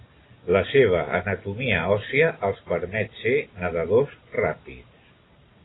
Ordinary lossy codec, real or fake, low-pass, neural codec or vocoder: AAC, 16 kbps; real; 7.2 kHz; none